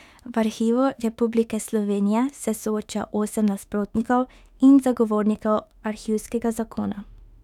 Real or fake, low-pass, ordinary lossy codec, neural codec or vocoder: fake; 19.8 kHz; none; autoencoder, 48 kHz, 32 numbers a frame, DAC-VAE, trained on Japanese speech